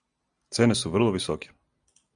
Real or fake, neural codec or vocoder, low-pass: real; none; 9.9 kHz